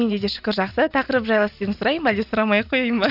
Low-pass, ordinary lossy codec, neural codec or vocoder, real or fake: 5.4 kHz; none; none; real